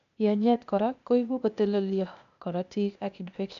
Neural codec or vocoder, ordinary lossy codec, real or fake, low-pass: codec, 16 kHz, 0.8 kbps, ZipCodec; none; fake; 7.2 kHz